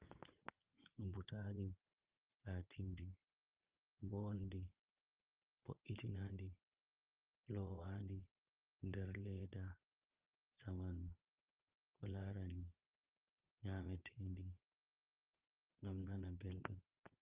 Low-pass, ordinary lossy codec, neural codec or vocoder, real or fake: 3.6 kHz; Opus, 24 kbps; vocoder, 22.05 kHz, 80 mel bands, Vocos; fake